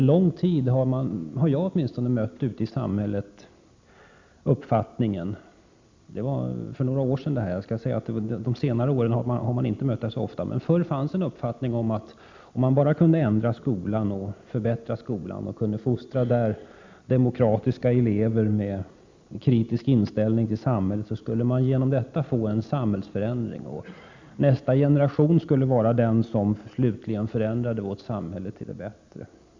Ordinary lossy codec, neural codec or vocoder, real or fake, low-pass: MP3, 64 kbps; none; real; 7.2 kHz